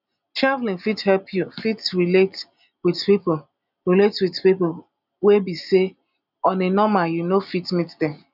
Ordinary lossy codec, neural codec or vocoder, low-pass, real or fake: none; none; 5.4 kHz; real